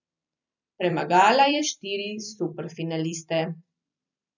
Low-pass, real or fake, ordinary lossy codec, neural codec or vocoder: 7.2 kHz; fake; none; vocoder, 44.1 kHz, 128 mel bands every 256 samples, BigVGAN v2